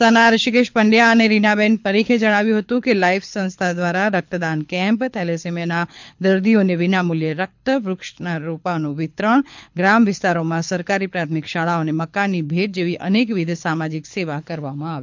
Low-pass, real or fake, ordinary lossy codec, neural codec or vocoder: 7.2 kHz; fake; MP3, 64 kbps; codec, 24 kHz, 6 kbps, HILCodec